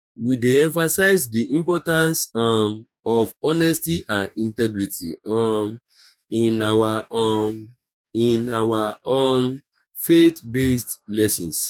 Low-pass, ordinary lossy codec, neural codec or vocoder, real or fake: 19.8 kHz; none; codec, 44.1 kHz, 2.6 kbps, DAC; fake